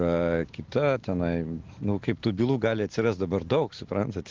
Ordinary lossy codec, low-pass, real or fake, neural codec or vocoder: Opus, 16 kbps; 7.2 kHz; real; none